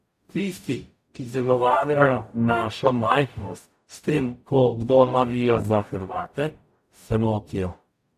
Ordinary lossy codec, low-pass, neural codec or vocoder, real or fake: AAC, 96 kbps; 14.4 kHz; codec, 44.1 kHz, 0.9 kbps, DAC; fake